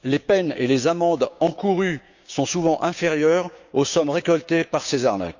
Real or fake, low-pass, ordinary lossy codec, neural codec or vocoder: fake; 7.2 kHz; none; codec, 16 kHz, 6 kbps, DAC